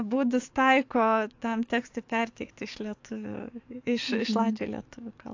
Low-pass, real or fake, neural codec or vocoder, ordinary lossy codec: 7.2 kHz; fake; codec, 44.1 kHz, 7.8 kbps, DAC; AAC, 48 kbps